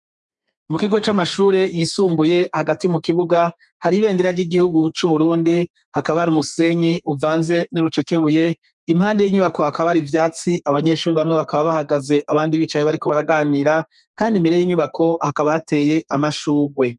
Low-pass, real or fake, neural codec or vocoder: 10.8 kHz; fake; codec, 32 kHz, 1.9 kbps, SNAC